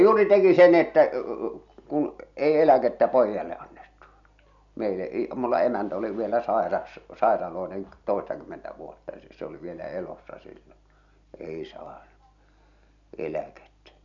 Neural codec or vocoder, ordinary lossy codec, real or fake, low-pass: none; none; real; 7.2 kHz